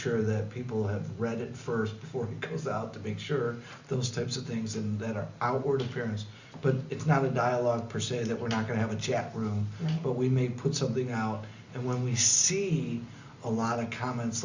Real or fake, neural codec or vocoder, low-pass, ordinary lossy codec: real; none; 7.2 kHz; Opus, 64 kbps